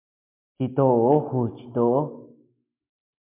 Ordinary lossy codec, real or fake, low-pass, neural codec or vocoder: MP3, 32 kbps; real; 3.6 kHz; none